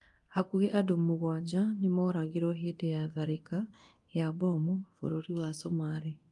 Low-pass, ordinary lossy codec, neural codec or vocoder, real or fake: 10.8 kHz; Opus, 32 kbps; codec, 24 kHz, 0.9 kbps, DualCodec; fake